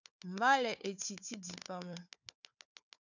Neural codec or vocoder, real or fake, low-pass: codec, 16 kHz, 4 kbps, FunCodec, trained on Chinese and English, 50 frames a second; fake; 7.2 kHz